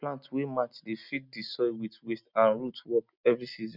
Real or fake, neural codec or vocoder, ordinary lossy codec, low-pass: real; none; none; 5.4 kHz